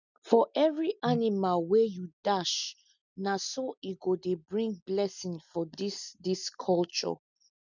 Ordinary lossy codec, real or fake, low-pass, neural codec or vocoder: none; real; 7.2 kHz; none